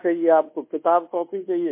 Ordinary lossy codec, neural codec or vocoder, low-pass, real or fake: MP3, 24 kbps; codec, 24 kHz, 1.2 kbps, DualCodec; 3.6 kHz; fake